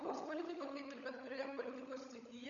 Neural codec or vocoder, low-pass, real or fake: codec, 16 kHz, 16 kbps, FunCodec, trained on LibriTTS, 50 frames a second; 7.2 kHz; fake